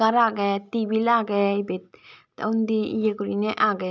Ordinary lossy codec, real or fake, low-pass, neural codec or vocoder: none; real; none; none